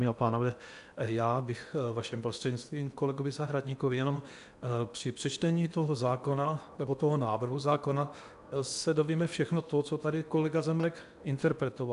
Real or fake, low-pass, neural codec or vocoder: fake; 10.8 kHz; codec, 16 kHz in and 24 kHz out, 0.8 kbps, FocalCodec, streaming, 65536 codes